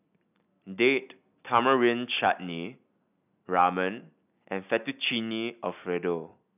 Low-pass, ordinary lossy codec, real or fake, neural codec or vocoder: 3.6 kHz; none; real; none